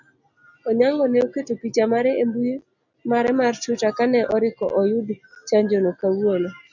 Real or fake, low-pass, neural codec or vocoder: real; 7.2 kHz; none